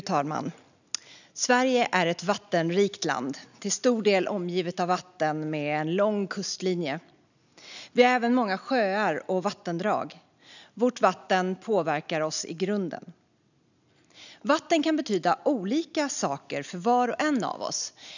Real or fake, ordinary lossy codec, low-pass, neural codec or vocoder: real; none; 7.2 kHz; none